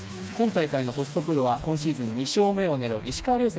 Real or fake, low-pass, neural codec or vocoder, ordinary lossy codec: fake; none; codec, 16 kHz, 2 kbps, FreqCodec, smaller model; none